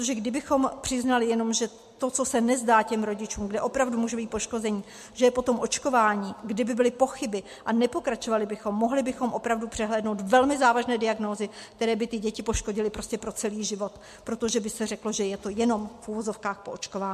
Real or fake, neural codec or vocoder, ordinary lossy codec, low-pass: real; none; MP3, 64 kbps; 14.4 kHz